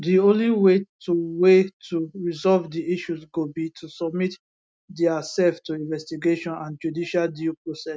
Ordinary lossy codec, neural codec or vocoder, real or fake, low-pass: none; none; real; none